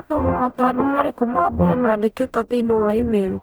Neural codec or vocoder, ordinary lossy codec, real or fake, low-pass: codec, 44.1 kHz, 0.9 kbps, DAC; none; fake; none